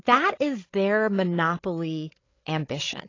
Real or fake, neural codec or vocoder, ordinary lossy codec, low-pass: fake; codec, 16 kHz, 16 kbps, FreqCodec, larger model; AAC, 32 kbps; 7.2 kHz